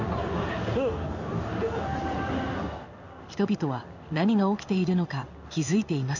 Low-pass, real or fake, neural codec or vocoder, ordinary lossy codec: 7.2 kHz; fake; codec, 16 kHz in and 24 kHz out, 1 kbps, XY-Tokenizer; none